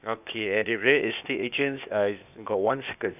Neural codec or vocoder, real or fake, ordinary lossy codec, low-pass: codec, 16 kHz, 0.8 kbps, ZipCodec; fake; none; 3.6 kHz